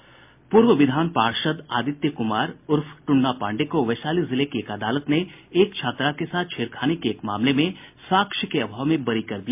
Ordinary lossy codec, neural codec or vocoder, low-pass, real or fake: MP3, 32 kbps; none; 3.6 kHz; real